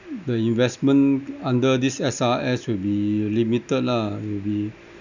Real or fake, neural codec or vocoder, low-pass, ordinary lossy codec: real; none; 7.2 kHz; none